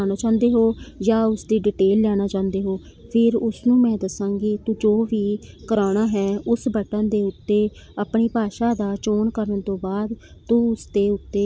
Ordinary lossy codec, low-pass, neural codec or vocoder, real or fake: none; none; none; real